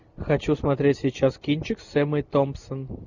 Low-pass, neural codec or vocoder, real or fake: 7.2 kHz; none; real